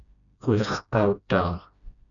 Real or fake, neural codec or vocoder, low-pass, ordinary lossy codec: fake; codec, 16 kHz, 1 kbps, FreqCodec, smaller model; 7.2 kHz; MP3, 96 kbps